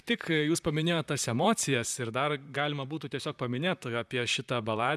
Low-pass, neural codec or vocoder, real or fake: 14.4 kHz; vocoder, 44.1 kHz, 128 mel bands, Pupu-Vocoder; fake